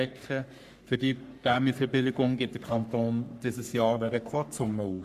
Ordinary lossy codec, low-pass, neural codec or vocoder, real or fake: Opus, 64 kbps; 14.4 kHz; codec, 44.1 kHz, 3.4 kbps, Pupu-Codec; fake